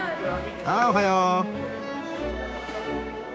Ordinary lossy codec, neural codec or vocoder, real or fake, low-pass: none; codec, 16 kHz, 6 kbps, DAC; fake; none